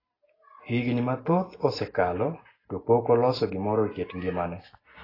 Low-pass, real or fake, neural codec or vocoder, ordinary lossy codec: 5.4 kHz; real; none; AAC, 24 kbps